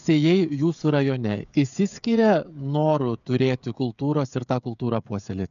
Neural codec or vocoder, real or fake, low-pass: codec, 16 kHz, 16 kbps, FreqCodec, smaller model; fake; 7.2 kHz